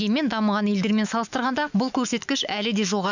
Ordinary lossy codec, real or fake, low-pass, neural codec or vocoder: none; fake; 7.2 kHz; autoencoder, 48 kHz, 128 numbers a frame, DAC-VAE, trained on Japanese speech